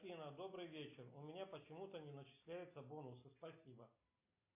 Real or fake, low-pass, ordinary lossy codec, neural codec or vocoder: real; 3.6 kHz; MP3, 24 kbps; none